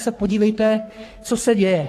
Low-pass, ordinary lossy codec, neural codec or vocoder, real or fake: 14.4 kHz; AAC, 64 kbps; codec, 44.1 kHz, 3.4 kbps, Pupu-Codec; fake